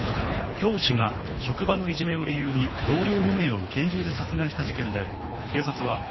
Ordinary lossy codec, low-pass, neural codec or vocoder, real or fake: MP3, 24 kbps; 7.2 kHz; codec, 24 kHz, 3 kbps, HILCodec; fake